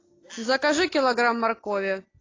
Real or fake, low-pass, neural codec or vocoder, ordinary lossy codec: real; 7.2 kHz; none; AAC, 32 kbps